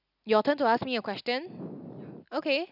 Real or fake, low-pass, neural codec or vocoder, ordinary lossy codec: real; 5.4 kHz; none; none